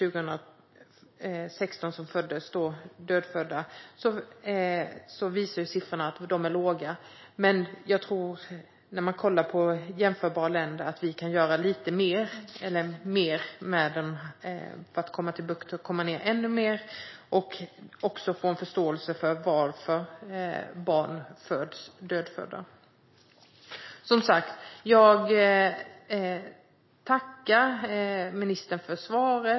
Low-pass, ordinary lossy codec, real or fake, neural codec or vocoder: 7.2 kHz; MP3, 24 kbps; real; none